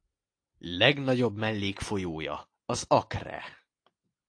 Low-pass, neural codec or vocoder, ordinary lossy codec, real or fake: 9.9 kHz; none; AAC, 48 kbps; real